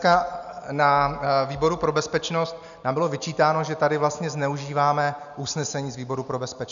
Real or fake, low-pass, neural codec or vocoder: real; 7.2 kHz; none